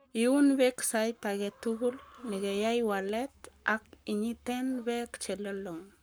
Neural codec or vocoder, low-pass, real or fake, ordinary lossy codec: codec, 44.1 kHz, 7.8 kbps, Pupu-Codec; none; fake; none